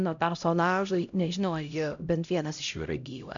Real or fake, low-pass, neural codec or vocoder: fake; 7.2 kHz; codec, 16 kHz, 0.5 kbps, X-Codec, HuBERT features, trained on LibriSpeech